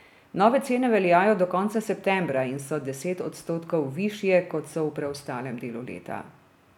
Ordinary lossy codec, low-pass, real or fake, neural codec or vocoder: none; 19.8 kHz; real; none